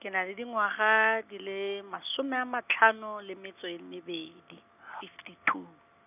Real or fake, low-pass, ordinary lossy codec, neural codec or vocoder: real; 3.6 kHz; none; none